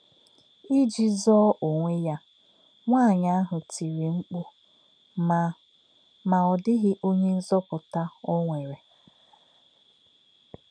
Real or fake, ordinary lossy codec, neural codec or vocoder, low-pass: real; none; none; 9.9 kHz